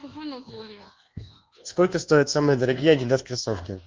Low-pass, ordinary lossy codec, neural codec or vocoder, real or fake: 7.2 kHz; Opus, 24 kbps; codec, 24 kHz, 1.2 kbps, DualCodec; fake